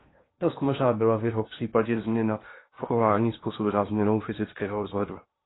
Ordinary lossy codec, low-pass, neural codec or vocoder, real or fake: AAC, 16 kbps; 7.2 kHz; codec, 16 kHz in and 24 kHz out, 0.8 kbps, FocalCodec, streaming, 65536 codes; fake